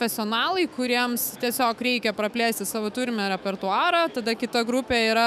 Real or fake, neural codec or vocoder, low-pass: fake; autoencoder, 48 kHz, 128 numbers a frame, DAC-VAE, trained on Japanese speech; 14.4 kHz